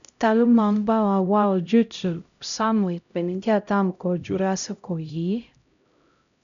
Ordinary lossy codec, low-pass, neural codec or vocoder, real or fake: MP3, 96 kbps; 7.2 kHz; codec, 16 kHz, 0.5 kbps, X-Codec, HuBERT features, trained on LibriSpeech; fake